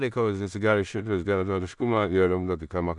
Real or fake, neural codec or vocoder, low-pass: fake; codec, 16 kHz in and 24 kHz out, 0.4 kbps, LongCat-Audio-Codec, two codebook decoder; 10.8 kHz